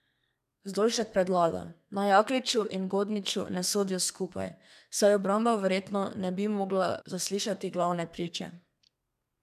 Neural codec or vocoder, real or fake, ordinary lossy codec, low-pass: codec, 32 kHz, 1.9 kbps, SNAC; fake; none; 14.4 kHz